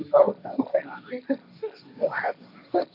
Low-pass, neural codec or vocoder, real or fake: 5.4 kHz; codec, 16 kHz, 1.1 kbps, Voila-Tokenizer; fake